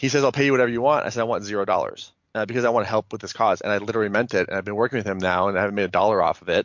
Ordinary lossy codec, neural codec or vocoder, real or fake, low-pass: MP3, 48 kbps; none; real; 7.2 kHz